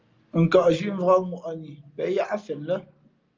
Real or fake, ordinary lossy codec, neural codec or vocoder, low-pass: real; Opus, 24 kbps; none; 7.2 kHz